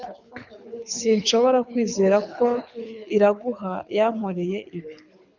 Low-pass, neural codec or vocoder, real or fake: 7.2 kHz; codec, 24 kHz, 6 kbps, HILCodec; fake